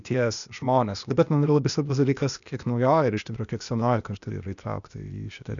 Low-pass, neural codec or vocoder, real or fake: 7.2 kHz; codec, 16 kHz, 0.8 kbps, ZipCodec; fake